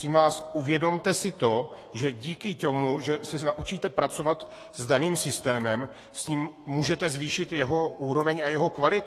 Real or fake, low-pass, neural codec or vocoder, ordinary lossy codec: fake; 14.4 kHz; codec, 32 kHz, 1.9 kbps, SNAC; AAC, 48 kbps